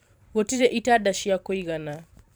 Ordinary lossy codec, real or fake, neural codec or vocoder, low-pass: none; fake; vocoder, 44.1 kHz, 128 mel bands every 512 samples, BigVGAN v2; none